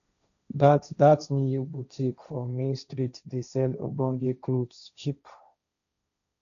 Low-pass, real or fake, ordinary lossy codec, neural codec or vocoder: 7.2 kHz; fake; none; codec, 16 kHz, 1.1 kbps, Voila-Tokenizer